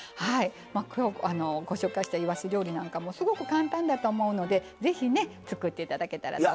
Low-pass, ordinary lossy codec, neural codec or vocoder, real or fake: none; none; none; real